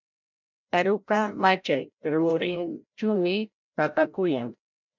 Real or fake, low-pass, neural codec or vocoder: fake; 7.2 kHz; codec, 16 kHz, 0.5 kbps, FreqCodec, larger model